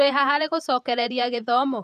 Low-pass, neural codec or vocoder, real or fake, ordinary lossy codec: 14.4 kHz; vocoder, 48 kHz, 128 mel bands, Vocos; fake; none